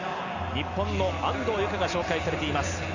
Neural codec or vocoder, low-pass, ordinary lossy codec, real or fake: none; 7.2 kHz; none; real